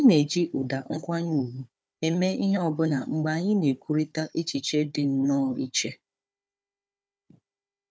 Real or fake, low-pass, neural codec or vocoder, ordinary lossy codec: fake; none; codec, 16 kHz, 4 kbps, FunCodec, trained on Chinese and English, 50 frames a second; none